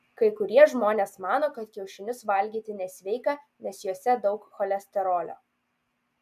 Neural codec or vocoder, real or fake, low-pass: none; real; 14.4 kHz